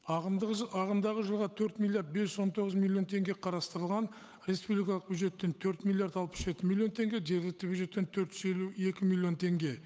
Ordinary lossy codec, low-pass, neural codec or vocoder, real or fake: none; none; codec, 16 kHz, 8 kbps, FunCodec, trained on Chinese and English, 25 frames a second; fake